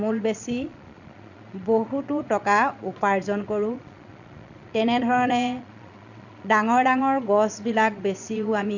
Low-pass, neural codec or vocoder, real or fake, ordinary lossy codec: 7.2 kHz; vocoder, 22.05 kHz, 80 mel bands, Vocos; fake; none